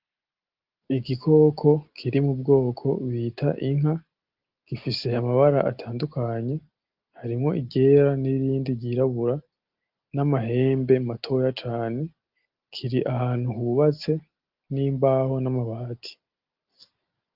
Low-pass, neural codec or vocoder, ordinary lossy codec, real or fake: 5.4 kHz; none; Opus, 32 kbps; real